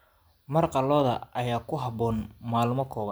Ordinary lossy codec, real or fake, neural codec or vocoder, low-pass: none; real; none; none